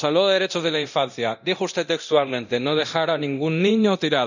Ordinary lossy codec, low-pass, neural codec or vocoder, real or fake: none; 7.2 kHz; codec, 24 kHz, 0.9 kbps, DualCodec; fake